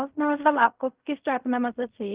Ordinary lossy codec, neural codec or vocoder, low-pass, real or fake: Opus, 24 kbps; codec, 16 kHz in and 24 kHz out, 0.4 kbps, LongCat-Audio-Codec, fine tuned four codebook decoder; 3.6 kHz; fake